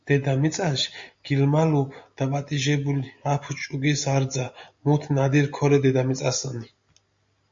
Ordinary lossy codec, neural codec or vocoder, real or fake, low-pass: MP3, 48 kbps; none; real; 7.2 kHz